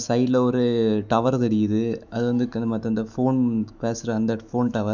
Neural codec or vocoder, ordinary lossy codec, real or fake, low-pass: autoencoder, 48 kHz, 128 numbers a frame, DAC-VAE, trained on Japanese speech; none; fake; 7.2 kHz